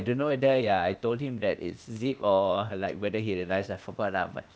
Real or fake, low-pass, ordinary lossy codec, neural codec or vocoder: fake; none; none; codec, 16 kHz, 0.8 kbps, ZipCodec